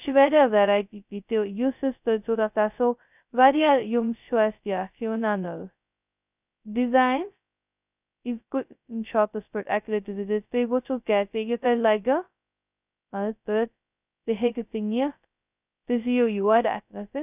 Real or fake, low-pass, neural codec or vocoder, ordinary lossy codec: fake; 3.6 kHz; codec, 16 kHz, 0.2 kbps, FocalCodec; none